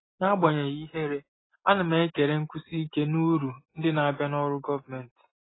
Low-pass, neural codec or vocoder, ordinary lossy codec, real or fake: 7.2 kHz; none; AAC, 16 kbps; real